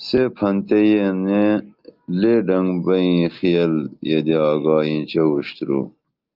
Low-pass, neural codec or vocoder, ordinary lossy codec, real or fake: 5.4 kHz; none; Opus, 24 kbps; real